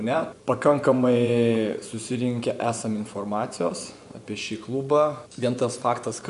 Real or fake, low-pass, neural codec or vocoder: fake; 10.8 kHz; vocoder, 24 kHz, 100 mel bands, Vocos